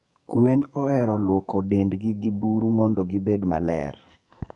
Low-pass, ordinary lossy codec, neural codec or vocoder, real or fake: 10.8 kHz; none; codec, 44.1 kHz, 2.6 kbps, SNAC; fake